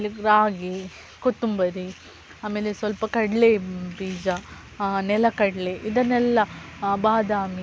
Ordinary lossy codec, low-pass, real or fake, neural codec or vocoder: none; none; real; none